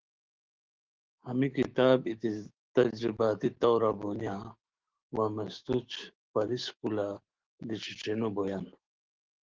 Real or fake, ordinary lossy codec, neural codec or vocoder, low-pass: fake; Opus, 16 kbps; autoencoder, 48 kHz, 128 numbers a frame, DAC-VAE, trained on Japanese speech; 7.2 kHz